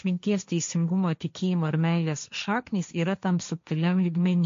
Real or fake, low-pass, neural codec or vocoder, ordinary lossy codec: fake; 7.2 kHz; codec, 16 kHz, 1.1 kbps, Voila-Tokenizer; MP3, 48 kbps